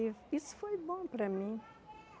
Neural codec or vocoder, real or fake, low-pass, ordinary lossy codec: none; real; none; none